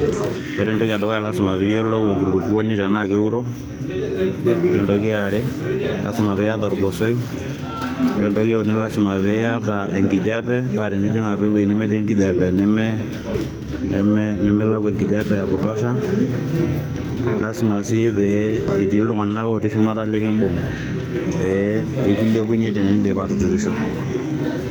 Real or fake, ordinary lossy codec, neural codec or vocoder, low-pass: fake; none; codec, 44.1 kHz, 2.6 kbps, SNAC; none